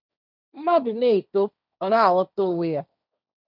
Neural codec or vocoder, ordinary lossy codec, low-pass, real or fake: codec, 16 kHz, 1.1 kbps, Voila-Tokenizer; none; 5.4 kHz; fake